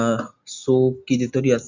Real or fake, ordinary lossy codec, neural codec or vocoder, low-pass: real; Opus, 64 kbps; none; 7.2 kHz